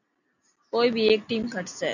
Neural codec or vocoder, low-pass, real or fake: none; 7.2 kHz; real